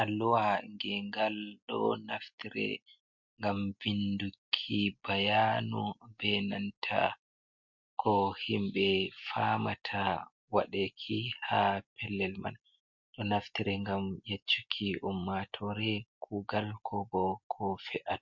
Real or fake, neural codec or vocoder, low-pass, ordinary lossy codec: real; none; 7.2 kHz; MP3, 48 kbps